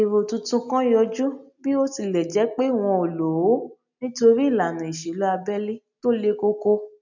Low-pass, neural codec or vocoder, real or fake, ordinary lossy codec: 7.2 kHz; none; real; none